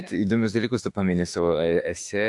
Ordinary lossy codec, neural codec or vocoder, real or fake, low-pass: AAC, 64 kbps; autoencoder, 48 kHz, 32 numbers a frame, DAC-VAE, trained on Japanese speech; fake; 10.8 kHz